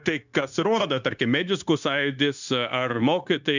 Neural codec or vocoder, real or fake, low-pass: codec, 16 kHz, 0.9 kbps, LongCat-Audio-Codec; fake; 7.2 kHz